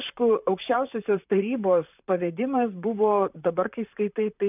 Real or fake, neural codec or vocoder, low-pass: fake; vocoder, 44.1 kHz, 128 mel bands, Pupu-Vocoder; 3.6 kHz